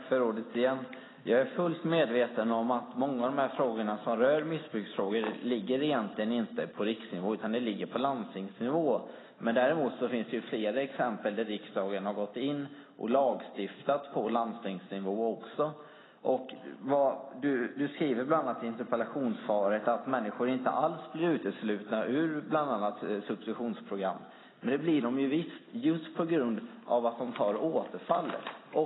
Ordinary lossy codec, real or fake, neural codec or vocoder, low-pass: AAC, 16 kbps; real; none; 7.2 kHz